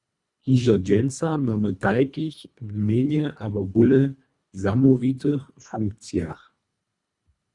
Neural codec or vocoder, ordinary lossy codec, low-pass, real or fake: codec, 24 kHz, 1.5 kbps, HILCodec; Opus, 64 kbps; 10.8 kHz; fake